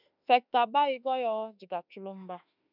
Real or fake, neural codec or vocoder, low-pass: fake; autoencoder, 48 kHz, 32 numbers a frame, DAC-VAE, trained on Japanese speech; 5.4 kHz